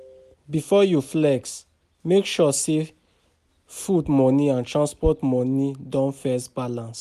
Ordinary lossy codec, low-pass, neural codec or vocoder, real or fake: none; 14.4 kHz; none; real